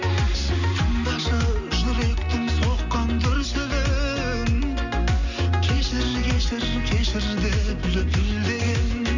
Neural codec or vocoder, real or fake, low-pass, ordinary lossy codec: none; real; 7.2 kHz; none